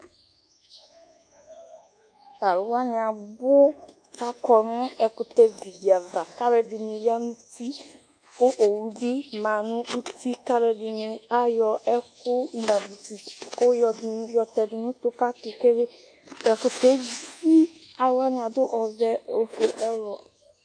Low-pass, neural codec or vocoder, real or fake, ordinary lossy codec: 9.9 kHz; codec, 24 kHz, 1.2 kbps, DualCodec; fake; AAC, 64 kbps